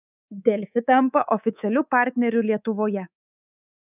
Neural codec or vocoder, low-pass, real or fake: codec, 24 kHz, 3.1 kbps, DualCodec; 3.6 kHz; fake